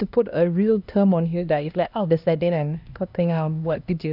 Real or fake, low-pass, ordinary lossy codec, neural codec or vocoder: fake; 5.4 kHz; none; codec, 16 kHz, 1 kbps, X-Codec, HuBERT features, trained on LibriSpeech